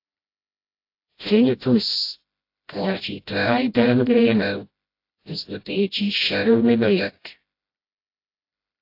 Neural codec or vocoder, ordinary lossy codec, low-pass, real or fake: codec, 16 kHz, 0.5 kbps, FreqCodec, smaller model; AAC, 32 kbps; 5.4 kHz; fake